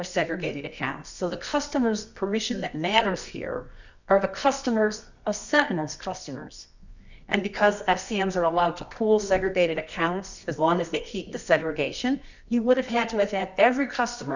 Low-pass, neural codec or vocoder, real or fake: 7.2 kHz; codec, 24 kHz, 0.9 kbps, WavTokenizer, medium music audio release; fake